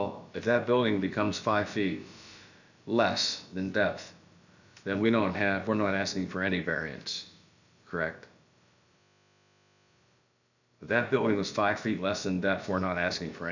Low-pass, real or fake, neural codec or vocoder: 7.2 kHz; fake; codec, 16 kHz, about 1 kbps, DyCAST, with the encoder's durations